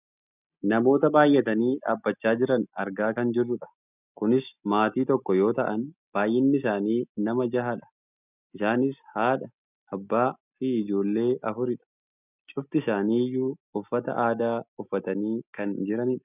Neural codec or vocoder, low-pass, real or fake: none; 3.6 kHz; real